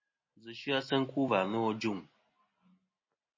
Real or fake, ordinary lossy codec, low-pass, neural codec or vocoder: real; MP3, 32 kbps; 7.2 kHz; none